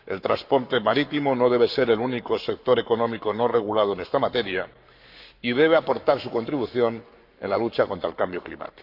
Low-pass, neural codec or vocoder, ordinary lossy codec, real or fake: 5.4 kHz; codec, 44.1 kHz, 7.8 kbps, Pupu-Codec; MP3, 48 kbps; fake